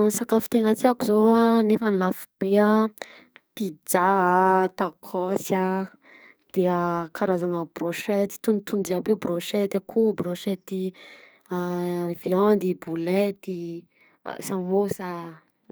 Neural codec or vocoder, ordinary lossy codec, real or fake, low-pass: codec, 44.1 kHz, 2.6 kbps, SNAC; none; fake; none